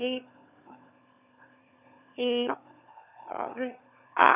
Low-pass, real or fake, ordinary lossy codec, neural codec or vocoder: 3.6 kHz; fake; none; autoencoder, 22.05 kHz, a latent of 192 numbers a frame, VITS, trained on one speaker